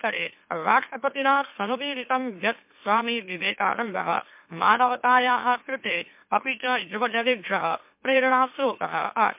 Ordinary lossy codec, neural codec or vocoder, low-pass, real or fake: MP3, 32 kbps; autoencoder, 44.1 kHz, a latent of 192 numbers a frame, MeloTTS; 3.6 kHz; fake